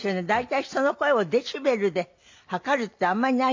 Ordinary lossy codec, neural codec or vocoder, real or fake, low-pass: MP3, 48 kbps; none; real; 7.2 kHz